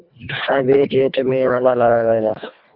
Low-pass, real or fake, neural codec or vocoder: 5.4 kHz; fake; codec, 24 kHz, 1.5 kbps, HILCodec